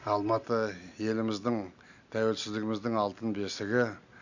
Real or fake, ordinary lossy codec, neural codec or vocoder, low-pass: real; none; none; 7.2 kHz